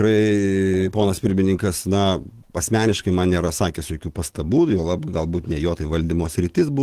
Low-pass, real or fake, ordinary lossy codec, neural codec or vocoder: 14.4 kHz; fake; Opus, 16 kbps; autoencoder, 48 kHz, 128 numbers a frame, DAC-VAE, trained on Japanese speech